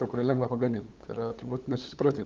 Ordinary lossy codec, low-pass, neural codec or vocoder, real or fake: Opus, 32 kbps; 7.2 kHz; codec, 16 kHz, 8 kbps, FunCodec, trained on LibriTTS, 25 frames a second; fake